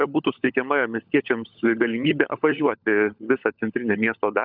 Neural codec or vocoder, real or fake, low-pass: codec, 16 kHz, 16 kbps, FunCodec, trained on LibriTTS, 50 frames a second; fake; 5.4 kHz